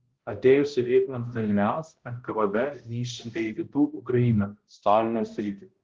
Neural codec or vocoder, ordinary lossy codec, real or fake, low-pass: codec, 16 kHz, 0.5 kbps, X-Codec, HuBERT features, trained on balanced general audio; Opus, 16 kbps; fake; 7.2 kHz